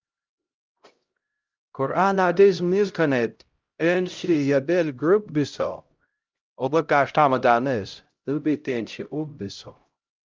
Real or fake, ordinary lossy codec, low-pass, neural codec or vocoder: fake; Opus, 24 kbps; 7.2 kHz; codec, 16 kHz, 0.5 kbps, X-Codec, HuBERT features, trained on LibriSpeech